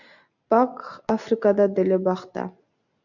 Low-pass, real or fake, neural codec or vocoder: 7.2 kHz; real; none